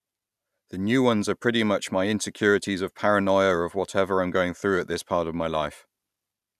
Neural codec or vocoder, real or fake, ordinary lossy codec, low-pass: none; real; none; 14.4 kHz